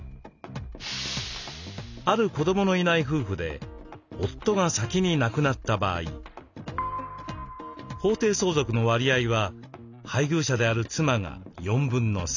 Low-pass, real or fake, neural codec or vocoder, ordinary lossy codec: 7.2 kHz; real; none; none